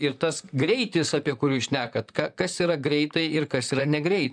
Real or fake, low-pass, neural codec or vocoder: fake; 9.9 kHz; vocoder, 44.1 kHz, 128 mel bands, Pupu-Vocoder